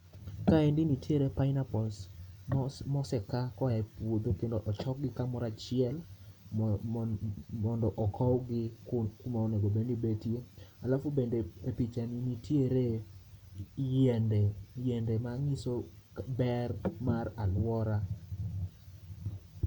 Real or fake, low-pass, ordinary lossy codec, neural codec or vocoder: real; 19.8 kHz; none; none